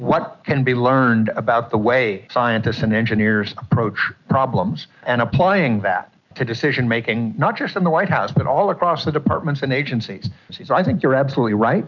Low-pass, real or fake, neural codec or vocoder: 7.2 kHz; real; none